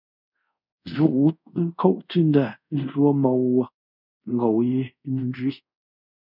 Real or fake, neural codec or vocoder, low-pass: fake; codec, 24 kHz, 0.5 kbps, DualCodec; 5.4 kHz